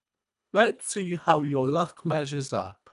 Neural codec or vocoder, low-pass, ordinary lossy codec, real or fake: codec, 24 kHz, 1.5 kbps, HILCodec; 10.8 kHz; none; fake